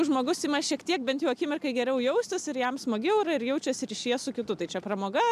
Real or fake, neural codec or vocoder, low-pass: real; none; 14.4 kHz